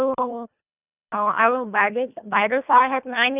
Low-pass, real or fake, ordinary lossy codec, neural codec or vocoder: 3.6 kHz; fake; none; codec, 24 kHz, 1.5 kbps, HILCodec